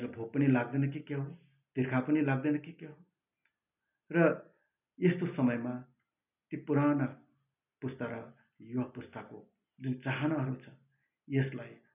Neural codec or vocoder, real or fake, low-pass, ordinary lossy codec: none; real; 3.6 kHz; none